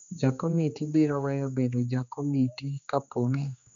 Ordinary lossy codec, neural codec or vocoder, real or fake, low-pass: none; codec, 16 kHz, 2 kbps, X-Codec, HuBERT features, trained on general audio; fake; 7.2 kHz